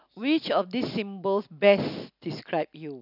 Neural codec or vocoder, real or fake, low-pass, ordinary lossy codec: none; real; 5.4 kHz; none